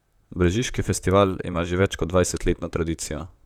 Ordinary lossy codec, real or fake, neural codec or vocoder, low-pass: none; fake; vocoder, 44.1 kHz, 128 mel bands, Pupu-Vocoder; 19.8 kHz